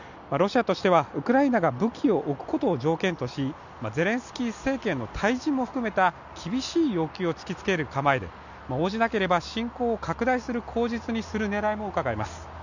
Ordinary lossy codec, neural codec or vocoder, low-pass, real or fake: none; none; 7.2 kHz; real